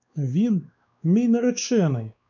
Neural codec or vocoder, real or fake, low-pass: codec, 16 kHz, 2 kbps, X-Codec, WavLM features, trained on Multilingual LibriSpeech; fake; 7.2 kHz